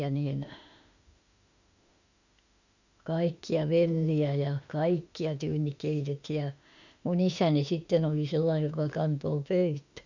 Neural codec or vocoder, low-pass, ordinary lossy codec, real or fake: autoencoder, 48 kHz, 32 numbers a frame, DAC-VAE, trained on Japanese speech; 7.2 kHz; none; fake